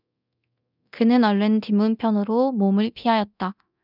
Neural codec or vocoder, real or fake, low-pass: codec, 24 kHz, 0.9 kbps, DualCodec; fake; 5.4 kHz